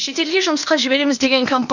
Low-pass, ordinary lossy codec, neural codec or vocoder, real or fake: 7.2 kHz; none; codec, 16 kHz, 0.8 kbps, ZipCodec; fake